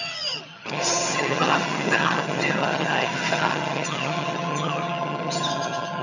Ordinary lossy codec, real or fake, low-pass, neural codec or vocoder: none; fake; 7.2 kHz; vocoder, 22.05 kHz, 80 mel bands, HiFi-GAN